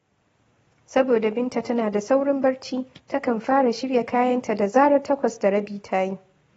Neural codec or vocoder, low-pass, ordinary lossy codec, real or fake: none; 19.8 kHz; AAC, 24 kbps; real